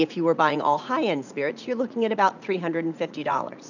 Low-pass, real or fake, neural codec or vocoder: 7.2 kHz; fake; vocoder, 44.1 kHz, 80 mel bands, Vocos